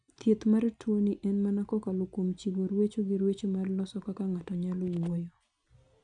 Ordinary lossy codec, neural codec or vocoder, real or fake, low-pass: none; none; real; 9.9 kHz